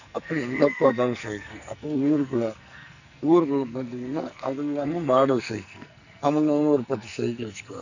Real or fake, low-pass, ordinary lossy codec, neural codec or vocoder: fake; 7.2 kHz; none; codec, 44.1 kHz, 2.6 kbps, SNAC